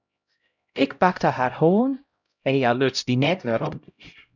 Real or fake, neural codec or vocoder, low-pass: fake; codec, 16 kHz, 0.5 kbps, X-Codec, HuBERT features, trained on LibriSpeech; 7.2 kHz